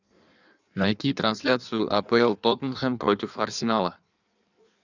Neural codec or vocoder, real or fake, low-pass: codec, 16 kHz in and 24 kHz out, 1.1 kbps, FireRedTTS-2 codec; fake; 7.2 kHz